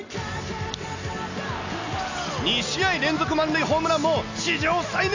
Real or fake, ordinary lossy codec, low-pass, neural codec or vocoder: real; AAC, 48 kbps; 7.2 kHz; none